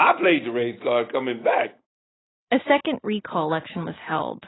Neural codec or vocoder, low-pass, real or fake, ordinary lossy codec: none; 7.2 kHz; real; AAC, 16 kbps